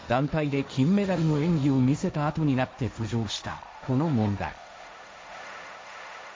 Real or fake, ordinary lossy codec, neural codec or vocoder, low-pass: fake; none; codec, 16 kHz, 1.1 kbps, Voila-Tokenizer; none